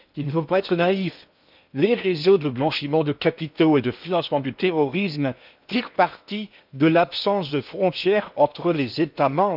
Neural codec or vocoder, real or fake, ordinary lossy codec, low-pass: codec, 16 kHz in and 24 kHz out, 0.8 kbps, FocalCodec, streaming, 65536 codes; fake; none; 5.4 kHz